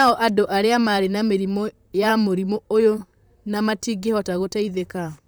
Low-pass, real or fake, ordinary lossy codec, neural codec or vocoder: none; fake; none; vocoder, 44.1 kHz, 128 mel bands, Pupu-Vocoder